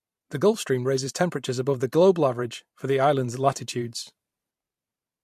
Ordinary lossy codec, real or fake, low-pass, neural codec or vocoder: MP3, 64 kbps; fake; 14.4 kHz; vocoder, 44.1 kHz, 128 mel bands every 512 samples, BigVGAN v2